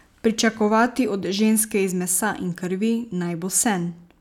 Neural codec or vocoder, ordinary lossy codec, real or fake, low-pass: none; none; real; 19.8 kHz